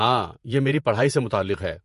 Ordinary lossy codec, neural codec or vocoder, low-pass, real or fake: MP3, 48 kbps; vocoder, 48 kHz, 128 mel bands, Vocos; 14.4 kHz; fake